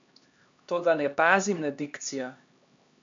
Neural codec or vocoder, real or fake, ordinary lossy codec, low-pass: codec, 16 kHz, 2 kbps, X-Codec, HuBERT features, trained on LibriSpeech; fake; AAC, 64 kbps; 7.2 kHz